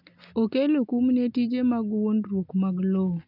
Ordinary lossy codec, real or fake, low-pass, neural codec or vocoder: none; real; 5.4 kHz; none